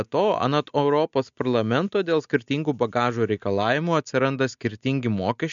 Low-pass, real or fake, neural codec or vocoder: 7.2 kHz; real; none